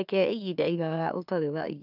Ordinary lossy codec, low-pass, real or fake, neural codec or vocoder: none; 5.4 kHz; fake; autoencoder, 44.1 kHz, a latent of 192 numbers a frame, MeloTTS